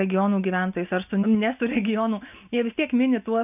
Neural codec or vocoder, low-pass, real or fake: none; 3.6 kHz; real